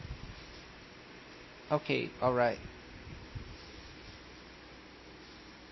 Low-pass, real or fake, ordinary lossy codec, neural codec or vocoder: 7.2 kHz; real; MP3, 24 kbps; none